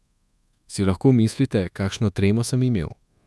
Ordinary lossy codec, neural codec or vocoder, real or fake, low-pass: none; codec, 24 kHz, 1.2 kbps, DualCodec; fake; none